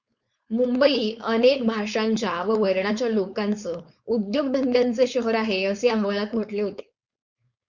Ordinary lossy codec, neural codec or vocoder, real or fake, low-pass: Opus, 64 kbps; codec, 16 kHz, 4.8 kbps, FACodec; fake; 7.2 kHz